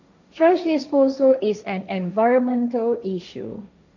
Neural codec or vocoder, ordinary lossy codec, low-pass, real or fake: codec, 16 kHz, 1.1 kbps, Voila-Tokenizer; none; 7.2 kHz; fake